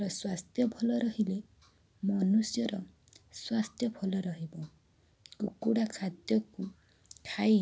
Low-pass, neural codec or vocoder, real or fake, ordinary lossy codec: none; none; real; none